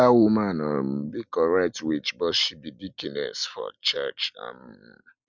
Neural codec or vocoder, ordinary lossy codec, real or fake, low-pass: none; none; real; 7.2 kHz